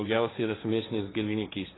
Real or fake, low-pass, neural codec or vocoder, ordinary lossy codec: fake; 7.2 kHz; codec, 16 kHz, 1.1 kbps, Voila-Tokenizer; AAC, 16 kbps